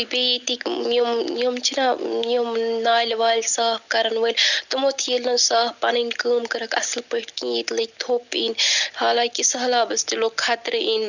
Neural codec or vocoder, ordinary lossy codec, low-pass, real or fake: none; none; 7.2 kHz; real